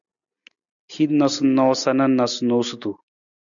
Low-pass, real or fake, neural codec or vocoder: 7.2 kHz; real; none